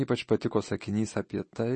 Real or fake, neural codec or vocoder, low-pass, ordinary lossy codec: real; none; 10.8 kHz; MP3, 32 kbps